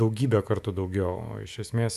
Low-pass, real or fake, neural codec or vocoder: 14.4 kHz; fake; autoencoder, 48 kHz, 128 numbers a frame, DAC-VAE, trained on Japanese speech